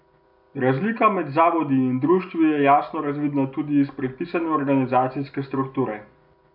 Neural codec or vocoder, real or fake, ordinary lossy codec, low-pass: none; real; none; 5.4 kHz